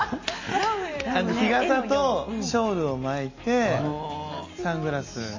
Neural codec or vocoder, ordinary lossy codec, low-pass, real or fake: none; MP3, 32 kbps; 7.2 kHz; real